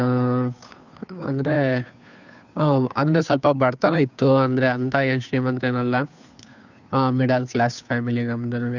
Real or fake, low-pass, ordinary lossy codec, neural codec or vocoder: fake; 7.2 kHz; none; codec, 16 kHz, 2 kbps, FunCodec, trained on Chinese and English, 25 frames a second